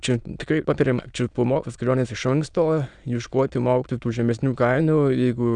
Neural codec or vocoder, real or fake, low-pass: autoencoder, 22.05 kHz, a latent of 192 numbers a frame, VITS, trained on many speakers; fake; 9.9 kHz